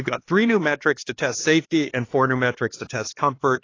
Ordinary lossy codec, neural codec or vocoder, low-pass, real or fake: AAC, 32 kbps; codec, 16 kHz, 2 kbps, X-Codec, HuBERT features, trained on LibriSpeech; 7.2 kHz; fake